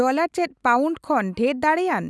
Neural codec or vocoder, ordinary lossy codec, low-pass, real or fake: none; none; none; real